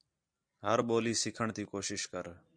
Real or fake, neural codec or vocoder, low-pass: real; none; 9.9 kHz